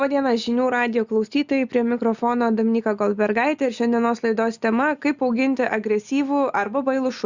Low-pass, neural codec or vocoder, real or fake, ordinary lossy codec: 7.2 kHz; none; real; Opus, 64 kbps